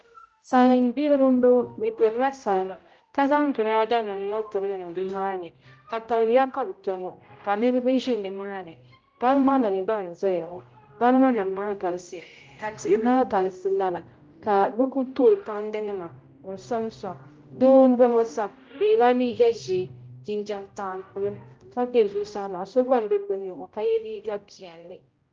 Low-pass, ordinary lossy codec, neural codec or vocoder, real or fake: 7.2 kHz; Opus, 24 kbps; codec, 16 kHz, 0.5 kbps, X-Codec, HuBERT features, trained on general audio; fake